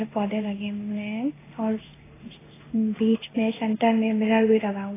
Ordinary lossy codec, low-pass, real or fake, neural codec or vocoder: AAC, 16 kbps; 3.6 kHz; fake; codec, 16 kHz in and 24 kHz out, 1 kbps, XY-Tokenizer